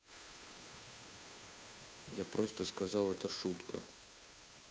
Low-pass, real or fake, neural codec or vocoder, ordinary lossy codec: none; fake; codec, 16 kHz, 2 kbps, FunCodec, trained on Chinese and English, 25 frames a second; none